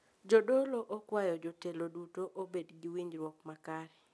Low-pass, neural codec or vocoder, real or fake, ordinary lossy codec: none; none; real; none